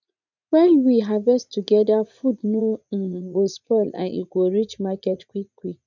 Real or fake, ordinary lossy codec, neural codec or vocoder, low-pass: fake; none; vocoder, 44.1 kHz, 80 mel bands, Vocos; 7.2 kHz